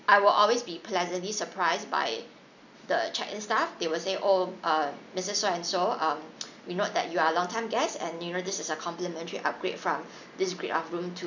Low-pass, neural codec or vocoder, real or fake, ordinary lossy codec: 7.2 kHz; none; real; none